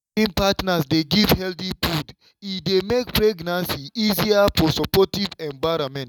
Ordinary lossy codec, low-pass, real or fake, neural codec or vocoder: none; 19.8 kHz; real; none